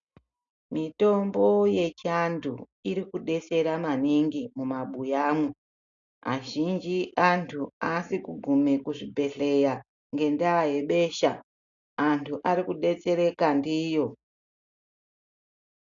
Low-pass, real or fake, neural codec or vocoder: 7.2 kHz; real; none